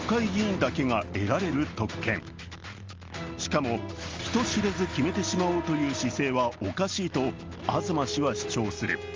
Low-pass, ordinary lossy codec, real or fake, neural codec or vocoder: 7.2 kHz; Opus, 32 kbps; real; none